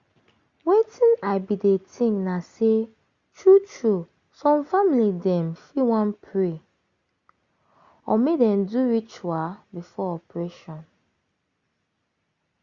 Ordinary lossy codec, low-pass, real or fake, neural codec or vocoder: Opus, 64 kbps; 7.2 kHz; real; none